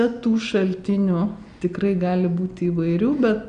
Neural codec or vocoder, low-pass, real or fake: none; 9.9 kHz; real